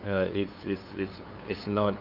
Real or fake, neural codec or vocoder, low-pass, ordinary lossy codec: fake; codec, 16 kHz, 2 kbps, FunCodec, trained on LibriTTS, 25 frames a second; 5.4 kHz; none